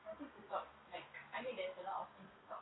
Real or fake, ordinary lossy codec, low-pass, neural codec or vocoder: real; AAC, 16 kbps; 7.2 kHz; none